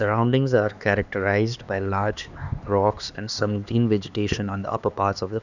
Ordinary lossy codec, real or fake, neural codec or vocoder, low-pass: none; fake; codec, 16 kHz, 2 kbps, X-Codec, HuBERT features, trained on LibriSpeech; 7.2 kHz